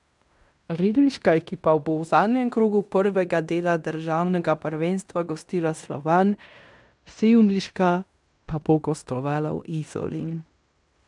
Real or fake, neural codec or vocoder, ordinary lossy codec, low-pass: fake; codec, 16 kHz in and 24 kHz out, 0.9 kbps, LongCat-Audio-Codec, fine tuned four codebook decoder; none; 10.8 kHz